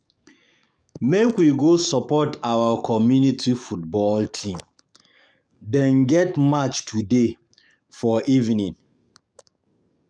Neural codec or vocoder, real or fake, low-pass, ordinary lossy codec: codec, 44.1 kHz, 7.8 kbps, DAC; fake; 9.9 kHz; none